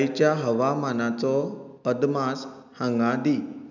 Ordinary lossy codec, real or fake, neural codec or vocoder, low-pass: none; real; none; 7.2 kHz